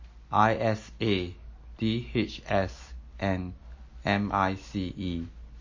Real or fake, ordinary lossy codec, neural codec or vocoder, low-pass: real; MP3, 32 kbps; none; 7.2 kHz